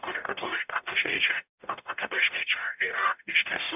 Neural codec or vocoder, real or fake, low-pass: codec, 44.1 kHz, 0.9 kbps, DAC; fake; 3.6 kHz